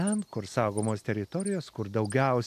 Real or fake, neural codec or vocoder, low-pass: real; none; 14.4 kHz